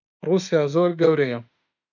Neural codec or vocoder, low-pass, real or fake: autoencoder, 48 kHz, 32 numbers a frame, DAC-VAE, trained on Japanese speech; 7.2 kHz; fake